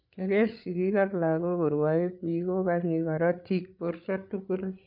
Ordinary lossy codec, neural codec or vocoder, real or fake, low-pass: none; codec, 16 kHz, 8 kbps, FreqCodec, larger model; fake; 5.4 kHz